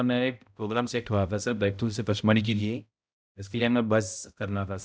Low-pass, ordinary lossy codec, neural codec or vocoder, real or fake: none; none; codec, 16 kHz, 0.5 kbps, X-Codec, HuBERT features, trained on balanced general audio; fake